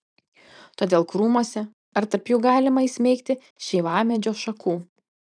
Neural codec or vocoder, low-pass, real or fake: vocoder, 44.1 kHz, 128 mel bands every 512 samples, BigVGAN v2; 9.9 kHz; fake